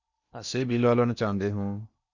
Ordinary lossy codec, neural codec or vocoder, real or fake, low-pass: Opus, 64 kbps; codec, 16 kHz in and 24 kHz out, 0.8 kbps, FocalCodec, streaming, 65536 codes; fake; 7.2 kHz